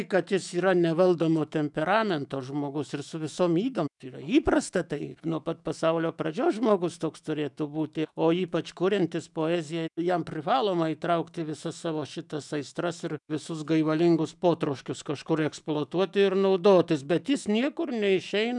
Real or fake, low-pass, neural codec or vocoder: fake; 10.8 kHz; autoencoder, 48 kHz, 128 numbers a frame, DAC-VAE, trained on Japanese speech